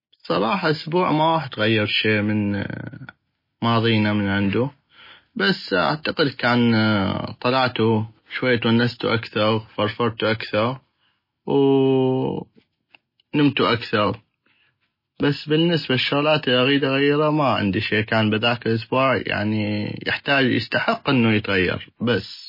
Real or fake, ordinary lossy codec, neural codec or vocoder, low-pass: real; MP3, 24 kbps; none; 5.4 kHz